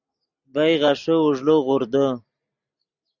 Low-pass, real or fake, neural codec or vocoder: 7.2 kHz; real; none